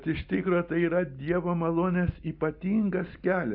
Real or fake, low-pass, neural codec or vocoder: real; 5.4 kHz; none